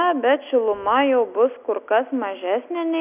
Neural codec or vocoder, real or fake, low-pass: none; real; 3.6 kHz